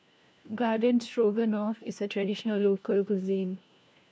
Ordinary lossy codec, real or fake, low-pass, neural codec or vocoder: none; fake; none; codec, 16 kHz, 1 kbps, FunCodec, trained on LibriTTS, 50 frames a second